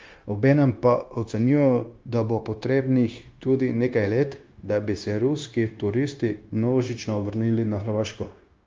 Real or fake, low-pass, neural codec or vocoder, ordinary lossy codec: fake; 7.2 kHz; codec, 16 kHz, 0.9 kbps, LongCat-Audio-Codec; Opus, 24 kbps